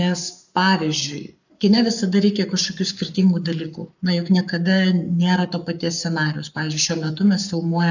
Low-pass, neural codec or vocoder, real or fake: 7.2 kHz; codec, 44.1 kHz, 7.8 kbps, Pupu-Codec; fake